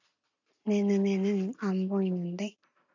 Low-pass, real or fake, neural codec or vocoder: 7.2 kHz; real; none